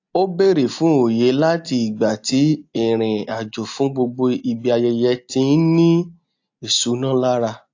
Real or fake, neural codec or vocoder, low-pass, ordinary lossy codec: real; none; 7.2 kHz; AAC, 48 kbps